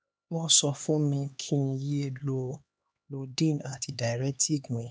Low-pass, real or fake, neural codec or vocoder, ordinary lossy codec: none; fake; codec, 16 kHz, 2 kbps, X-Codec, HuBERT features, trained on LibriSpeech; none